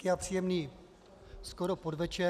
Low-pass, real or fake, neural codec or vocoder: 14.4 kHz; real; none